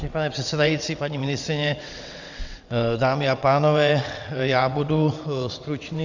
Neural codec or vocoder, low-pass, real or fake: vocoder, 22.05 kHz, 80 mel bands, WaveNeXt; 7.2 kHz; fake